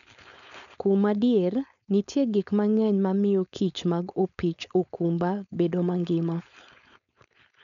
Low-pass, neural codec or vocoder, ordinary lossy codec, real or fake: 7.2 kHz; codec, 16 kHz, 4.8 kbps, FACodec; none; fake